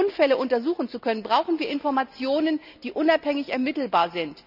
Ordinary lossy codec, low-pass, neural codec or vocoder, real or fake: none; 5.4 kHz; none; real